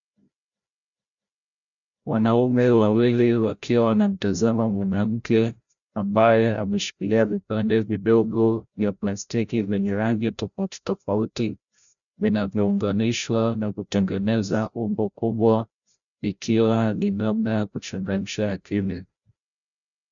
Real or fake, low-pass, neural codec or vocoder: fake; 7.2 kHz; codec, 16 kHz, 0.5 kbps, FreqCodec, larger model